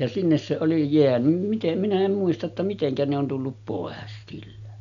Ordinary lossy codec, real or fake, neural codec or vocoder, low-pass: none; real; none; 7.2 kHz